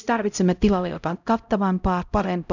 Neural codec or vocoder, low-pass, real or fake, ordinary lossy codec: codec, 16 kHz, 0.5 kbps, X-Codec, HuBERT features, trained on LibriSpeech; 7.2 kHz; fake; none